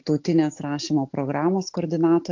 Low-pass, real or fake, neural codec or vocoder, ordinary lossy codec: 7.2 kHz; real; none; AAC, 48 kbps